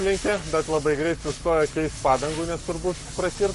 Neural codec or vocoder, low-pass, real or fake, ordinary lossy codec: codec, 44.1 kHz, 7.8 kbps, Pupu-Codec; 14.4 kHz; fake; MP3, 48 kbps